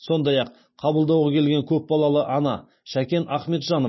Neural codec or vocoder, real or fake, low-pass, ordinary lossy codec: none; real; 7.2 kHz; MP3, 24 kbps